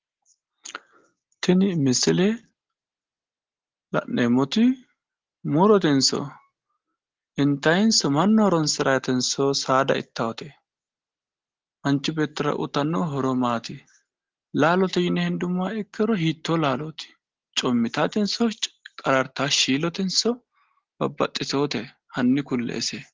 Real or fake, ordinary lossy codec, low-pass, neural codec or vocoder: real; Opus, 16 kbps; 7.2 kHz; none